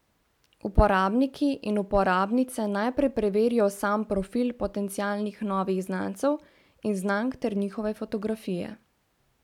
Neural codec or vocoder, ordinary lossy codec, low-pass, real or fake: none; none; 19.8 kHz; real